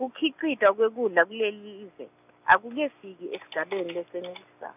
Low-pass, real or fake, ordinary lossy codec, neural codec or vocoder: 3.6 kHz; real; none; none